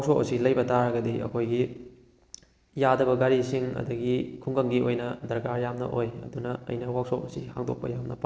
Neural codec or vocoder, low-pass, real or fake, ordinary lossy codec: none; none; real; none